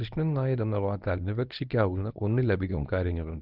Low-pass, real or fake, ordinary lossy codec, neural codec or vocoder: 5.4 kHz; fake; Opus, 32 kbps; codec, 24 kHz, 0.9 kbps, WavTokenizer, medium speech release version 1